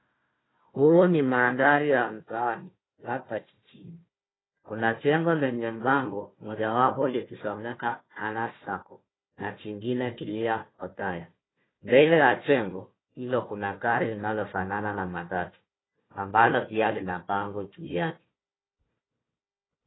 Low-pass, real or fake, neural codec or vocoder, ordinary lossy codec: 7.2 kHz; fake; codec, 16 kHz, 1 kbps, FunCodec, trained on Chinese and English, 50 frames a second; AAC, 16 kbps